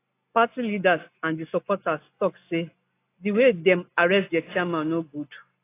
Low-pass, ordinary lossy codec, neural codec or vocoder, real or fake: 3.6 kHz; AAC, 24 kbps; none; real